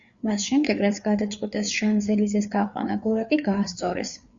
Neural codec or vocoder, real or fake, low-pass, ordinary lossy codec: codec, 16 kHz, 4 kbps, FreqCodec, larger model; fake; 7.2 kHz; Opus, 64 kbps